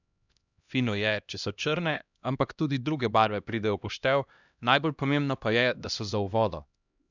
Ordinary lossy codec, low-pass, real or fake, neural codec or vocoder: none; 7.2 kHz; fake; codec, 16 kHz, 1 kbps, X-Codec, HuBERT features, trained on LibriSpeech